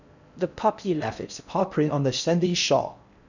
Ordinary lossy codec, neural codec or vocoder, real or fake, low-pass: none; codec, 16 kHz in and 24 kHz out, 0.6 kbps, FocalCodec, streaming, 4096 codes; fake; 7.2 kHz